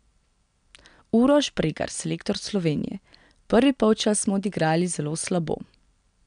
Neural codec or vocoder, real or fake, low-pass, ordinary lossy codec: none; real; 9.9 kHz; none